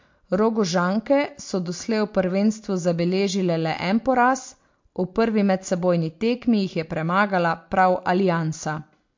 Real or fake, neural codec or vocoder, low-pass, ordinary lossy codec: real; none; 7.2 kHz; MP3, 48 kbps